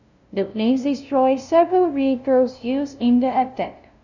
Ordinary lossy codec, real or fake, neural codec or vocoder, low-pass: none; fake; codec, 16 kHz, 0.5 kbps, FunCodec, trained on LibriTTS, 25 frames a second; 7.2 kHz